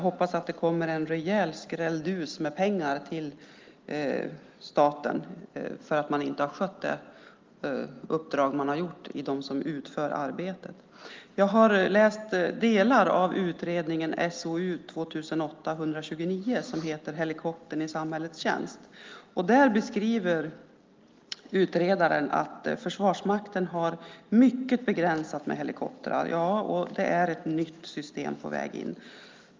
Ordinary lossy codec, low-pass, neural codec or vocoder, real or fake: Opus, 32 kbps; 7.2 kHz; none; real